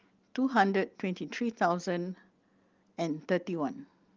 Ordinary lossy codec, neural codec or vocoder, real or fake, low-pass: Opus, 32 kbps; none; real; 7.2 kHz